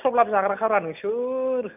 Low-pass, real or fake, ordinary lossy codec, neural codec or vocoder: 3.6 kHz; real; none; none